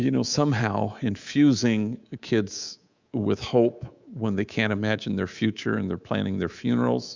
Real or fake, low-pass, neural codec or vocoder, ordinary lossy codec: fake; 7.2 kHz; codec, 24 kHz, 3.1 kbps, DualCodec; Opus, 64 kbps